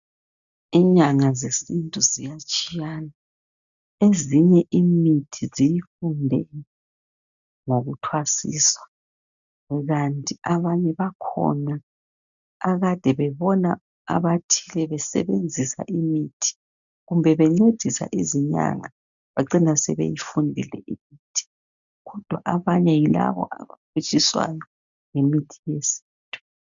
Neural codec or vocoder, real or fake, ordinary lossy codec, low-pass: none; real; MP3, 96 kbps; 7.2 kHz